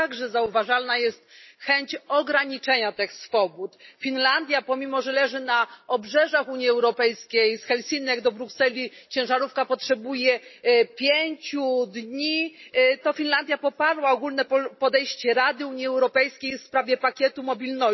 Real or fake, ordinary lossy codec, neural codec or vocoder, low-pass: real; MP3, 24 kbps; none; 7.2 kHz